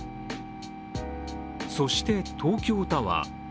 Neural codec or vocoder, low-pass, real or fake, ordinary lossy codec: none; none; real; none